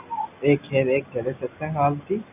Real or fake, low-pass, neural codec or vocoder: real; 3.6 kHz; none